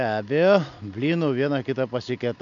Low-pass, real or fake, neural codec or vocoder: 7.2 kHz; real; none